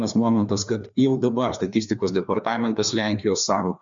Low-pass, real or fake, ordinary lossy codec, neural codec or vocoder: 7.2 kHz; fake; MP3, 64 kbps; codec, 16 kHz, 2 kbps, FreqCodec, larger model